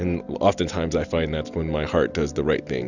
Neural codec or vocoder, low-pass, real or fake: none; 7.2 kHz; real